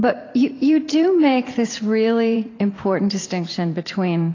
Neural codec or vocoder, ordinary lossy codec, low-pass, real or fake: vocoder, 44.1 kHz, 128 mel bands every 512 samples, BigVGAN v2; AAC, 32 kbps; 7.2 kHz; fake